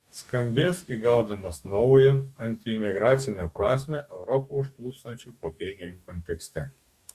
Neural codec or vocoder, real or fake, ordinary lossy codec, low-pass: codec, 44.1 kHz, 2.6 kbps, DAC; fake; Opus, 64 kbps; 14.4 kHz